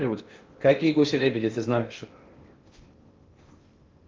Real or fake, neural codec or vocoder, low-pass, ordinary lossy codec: fake; codec, 16 kHz in and 24 kHz out, 0.8 kbps, FocalCodec, streaming, 65536 codes; 7.2 kHz; Opus, 32 kbps